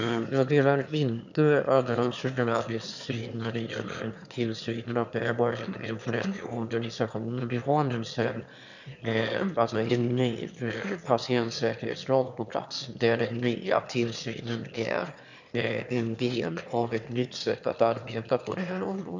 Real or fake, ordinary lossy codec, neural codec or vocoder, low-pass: fake; none; autoencoder, 22.05 kHz, a latent of 192 numbers a frame, VITS, trained on one speaker; 7.2 kHz